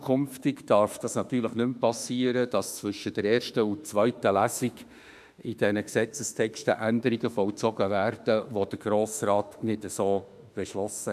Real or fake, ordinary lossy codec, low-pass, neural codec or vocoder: fake; none; 14.4 kHz; autoencoder, 48 kHz, 32 numbers a frame, DAC-VAE, trained on Japanese speech